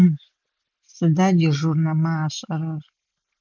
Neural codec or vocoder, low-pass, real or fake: none; 7.2 kHz; real